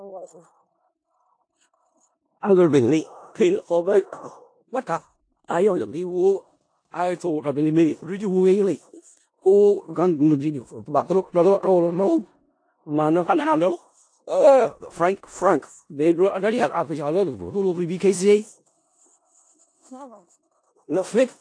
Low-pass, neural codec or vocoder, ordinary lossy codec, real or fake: 9.9 kHz; codec, 16 kHz in and 24 kHz out, 0.4 kbps, LongCat-Audio-Codec, four codebook decoder; AAC, 48 kbps; fake